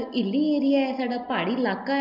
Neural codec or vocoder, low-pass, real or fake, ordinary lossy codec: none; 5.4 kHz; real; none